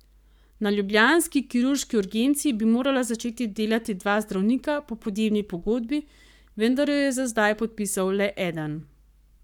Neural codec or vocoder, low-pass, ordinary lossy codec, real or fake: codec, 44.1 kHz, 7.8 kbps, Pupu-Codec; 19.8 kHz; none; fake